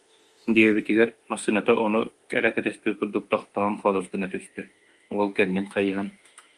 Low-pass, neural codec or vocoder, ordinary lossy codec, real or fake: 10.8 kHz; autoencoder, 48 kHz, 32 numbers a frame, DAC-VAE, trained on Japanese speech; Opus, 32 kbps; fake